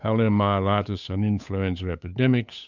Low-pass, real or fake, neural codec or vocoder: 7.2 kHz; fake; codec, 16 kHz, 8 kbps, FunCodec, trained on Chinese and English, 25 frames a second